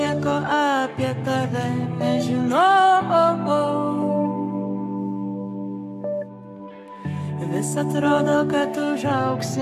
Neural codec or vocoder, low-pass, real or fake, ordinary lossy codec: codec, 44.1 kHz, 7.8 kbps, Pupu-Codec; 14.4 kHz; fake; MP3, 96 kbps